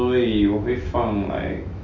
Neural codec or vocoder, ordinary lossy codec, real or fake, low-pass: autoencoder, 48 kHz, 128 numbers a frame, DAC-VAE, trained on Japanese speech; none; fake; 7.2 kHz